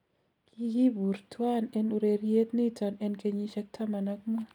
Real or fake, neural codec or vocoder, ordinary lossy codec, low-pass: real; none; none; 19.8 kHz